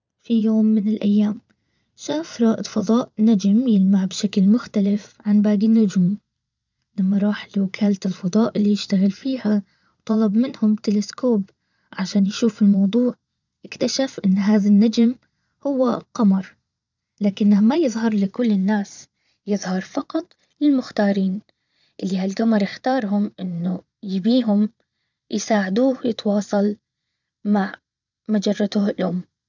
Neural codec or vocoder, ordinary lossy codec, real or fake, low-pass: vocoder, 22.05 kHz, 80 mel bands, Vocos; none; fake; 7.2 kHz